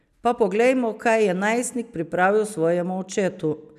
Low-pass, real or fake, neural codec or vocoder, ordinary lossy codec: 14.4 kHz; real; none; none